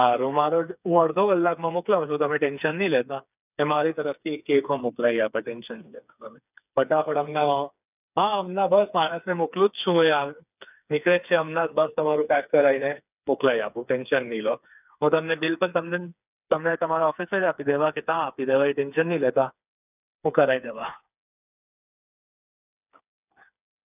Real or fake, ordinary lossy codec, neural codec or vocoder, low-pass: fake; none; codec, 16 kHz, 4 kbps, FreqCodec, smaller model; 3.6 kHz